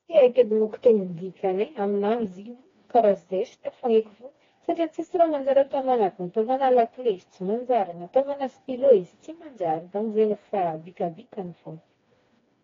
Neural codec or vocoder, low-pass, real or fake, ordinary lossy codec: codec, 16 kHz, 2 kbps, FreqCodec, smaller model; 7.2 kHz; fake; AAC, 32 kbps